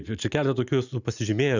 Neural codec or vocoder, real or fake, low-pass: none; real; 7.2 kHz